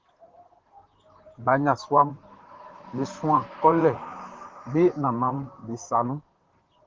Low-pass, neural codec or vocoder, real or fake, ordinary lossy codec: 7.2 kHz; vocoder, 44.1 kHz, 128 mel bands, Pupu-Vocoder; fake; Opus, 16 kbps